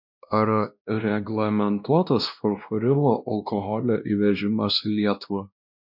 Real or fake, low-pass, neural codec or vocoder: fake; 5.4 kHz; codec, 16 kHz, 1 kbps, X-Codec, WavLM features, trained on Multilingual LibriSpeech